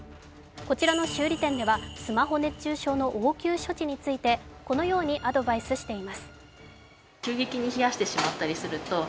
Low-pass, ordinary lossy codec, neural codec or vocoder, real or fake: none; none; none; real